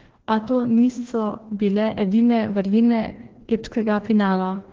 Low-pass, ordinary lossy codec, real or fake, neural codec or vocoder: 7.2 kHz; Opus, 16 kbps; fake; codec, 16 kHz, 1 kbps, FreqCodec, larger model